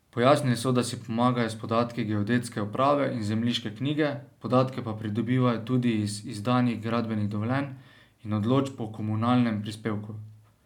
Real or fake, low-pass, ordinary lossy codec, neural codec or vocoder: real; 19.8 kHz; none; none